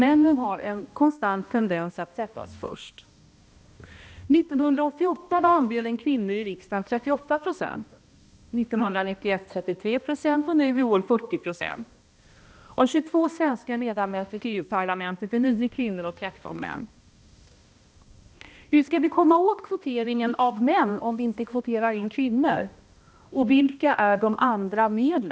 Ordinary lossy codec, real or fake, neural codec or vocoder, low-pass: none; fake; codec, 16 kHz, 1 kbps, X-Codec, HuBERT features, trained on balanced general audio; none